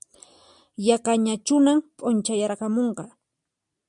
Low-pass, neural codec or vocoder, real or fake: 10.8 kHz; none; real